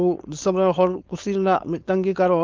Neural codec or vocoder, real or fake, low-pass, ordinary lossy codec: codec, 16 kHz, 4.8 kbps, FACodec; fake; 7.2 kHz; Opus, 32 kbps